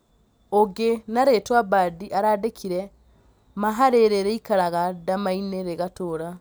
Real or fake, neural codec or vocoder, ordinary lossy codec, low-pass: real; none; none; none